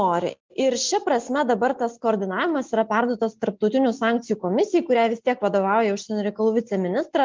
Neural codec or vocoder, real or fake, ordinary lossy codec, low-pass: none; real; Opus, 32 kbps; 7.2 kHz